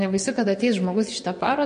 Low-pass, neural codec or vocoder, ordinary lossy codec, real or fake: 9.9 kHz; vocoder, 22.05 kHz, 80 mel bands, WaveNeXt; MP3, 48 kbps; fake